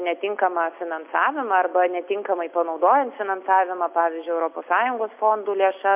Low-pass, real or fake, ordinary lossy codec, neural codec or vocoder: 3.6 kHz; real; MP3, 32 kbps; none